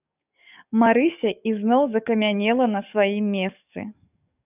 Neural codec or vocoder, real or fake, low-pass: codec, 44.1 kHz, 7.8 kbps, DAC; fake; 3.6 kHz